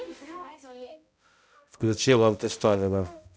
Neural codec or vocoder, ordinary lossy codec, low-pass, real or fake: codec, 16 kHz, 0.5 kbps, X-Codec, HuBERT features, trained on balanced general audio; none; none; fake